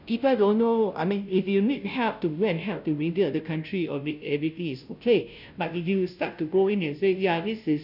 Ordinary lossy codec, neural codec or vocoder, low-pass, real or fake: none; codec, 16 kHz, 0.5 kbps, FunCodec, trained on Chinese and English, 25 frames a second; 5.4 kHz; fake